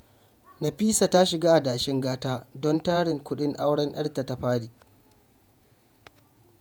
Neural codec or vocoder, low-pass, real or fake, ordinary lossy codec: vocoder, 48 kHz, 128 mel bands, Vocos; none; fake; none